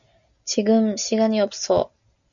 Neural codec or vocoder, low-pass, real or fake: none; 7.2 kHz; real